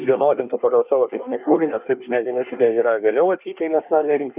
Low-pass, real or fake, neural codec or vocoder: 3.6 kHz; fake; codec, 24 kHz, 1 kbps, SNAC